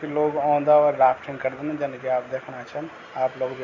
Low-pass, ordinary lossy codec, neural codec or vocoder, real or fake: 7.2 kHz; none; none; real